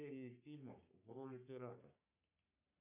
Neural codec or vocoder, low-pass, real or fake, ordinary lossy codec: codec, 44.1 kHz, 3.4 kbps, Pupu-Codec; 3.6 kHz; fake; MP3, 32 kbps